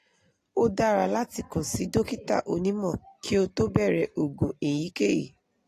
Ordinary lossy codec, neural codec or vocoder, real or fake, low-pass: AAC, 48 kbps; none; real; 14.4 kHz